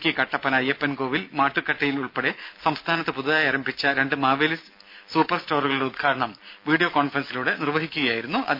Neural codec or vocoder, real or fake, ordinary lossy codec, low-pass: none; real; MP3, 48 kbps; 5.4 kHz